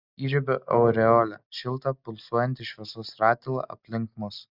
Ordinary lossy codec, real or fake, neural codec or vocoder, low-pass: Opus, 64 kbps; real; none; 5.4 kHz